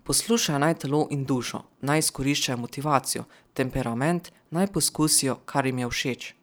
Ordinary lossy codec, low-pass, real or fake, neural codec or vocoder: none; none; fake; vocoder, 44.1 kHz, 128 mel bands every 512 samples, BigVGAN v2